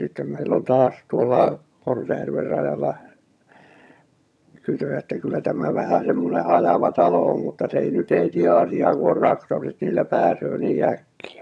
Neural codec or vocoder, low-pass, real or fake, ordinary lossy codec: vocoder, 22.05 kHz, 80 mel bands, HiFi-GAN; none; fake; none